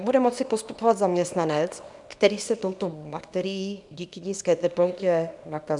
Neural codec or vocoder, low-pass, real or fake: codec, 24 kHz, 0.9 kbps, WavTokenizer, small release; 10.8 kHz; fake